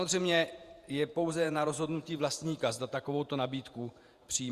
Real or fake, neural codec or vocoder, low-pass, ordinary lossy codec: fake; vocoder, 48 kHz, 128 mel bands, Vocos; 14.4 kHz; Opus, 64 kbps